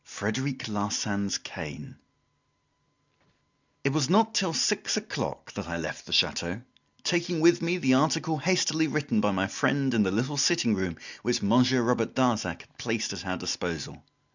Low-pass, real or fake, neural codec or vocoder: 7.2 kHz; real; none